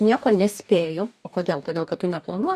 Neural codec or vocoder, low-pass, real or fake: codec, 44.1 kHz, 2.6 kbps, DAC; 14.4 kHz; fake